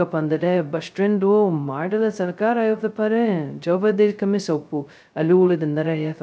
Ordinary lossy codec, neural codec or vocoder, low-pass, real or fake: none; codec, 16 kHz, 0.2 kbps, FocalCodec; none; fake